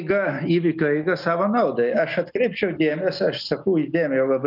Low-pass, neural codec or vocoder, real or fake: 5.4 kHz; none; real